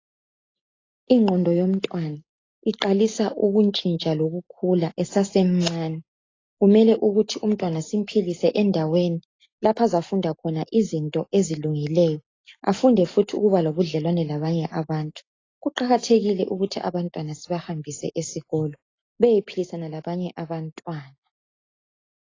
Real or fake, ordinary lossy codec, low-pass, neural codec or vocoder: real; AAC, 32 kbps; 7.2 kHz; none